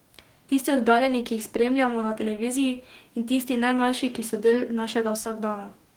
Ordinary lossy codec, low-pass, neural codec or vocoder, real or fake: Opus, 24 kbps; 19.8 kHz; codec, 44.1 kHz, 2.6 kbps, DAC; fake